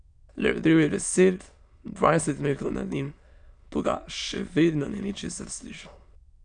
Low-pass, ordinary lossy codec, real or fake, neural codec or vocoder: 9.9 kHz; none; fake; autoencoder, 22.05 kHz, a latent of 192 numbers a frame, VITS, trained on many speakers